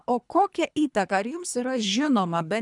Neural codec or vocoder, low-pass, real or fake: codec, 24 kHz, 3 kbps, HILCodec; 10.8 kHz; fake